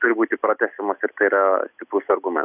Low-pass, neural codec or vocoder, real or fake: 3.6 kHz; none; real